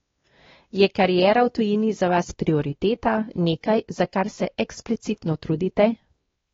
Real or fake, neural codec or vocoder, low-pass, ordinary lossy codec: fake; codec, 16 kHz, 2 kbps, X-Codec, WavLM features, trained on Multilingual LibriSpeech; 7.2 kHz; AAC, 24 kbps